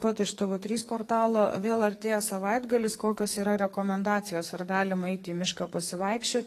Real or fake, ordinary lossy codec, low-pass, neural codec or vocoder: fake; AAC, 48 kbps; 14.4 kHz; codec, 44.1 kHz, 2.6 kbps, SNAC